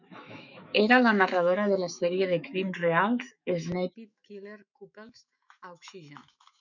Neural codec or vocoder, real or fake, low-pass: autoencoder, 48 kHz, 128 numbers a frame, DAC-VAE, trained on Japanese speech; fake; 7.2 kHz